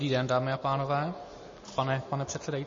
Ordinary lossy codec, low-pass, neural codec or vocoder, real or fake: MP3, 32 kbps; 7.2 kHz; none; real